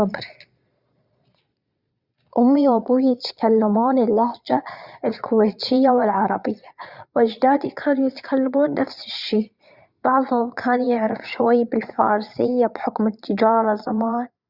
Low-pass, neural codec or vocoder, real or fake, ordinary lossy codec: 5.4 kHz; vocoder, 22.05 kHz, 80 mel bands, Vocos; fake; Opus, 64 kbps